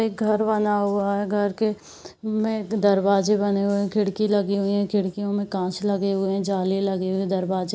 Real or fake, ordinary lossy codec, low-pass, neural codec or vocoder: real; none; none; none